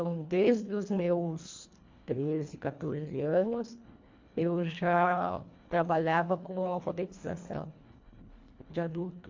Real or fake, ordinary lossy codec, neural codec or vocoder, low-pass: fake; MP3, 48 kbps; codec, 24 kHz, 1.5 kbps, HILCodec; 7.2 kHz